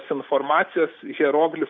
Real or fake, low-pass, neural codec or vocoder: real; 7.2 kHz; none